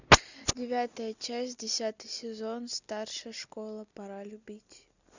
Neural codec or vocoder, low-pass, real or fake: none; 7.2 kHz; real